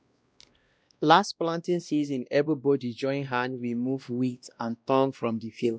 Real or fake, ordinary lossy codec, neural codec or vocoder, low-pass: fake; none; codec, 16 kHz, 1 kbps, X-Codec, WavLM features, trained on Multilingual LibriSpeech; none